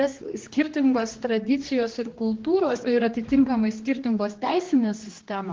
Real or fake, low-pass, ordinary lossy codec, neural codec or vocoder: fake; 7.2 kHz; Opus, 16 kbps; codec, 16 kHz, 2 kbps, X-Codec, HuBERT features, trained on general audio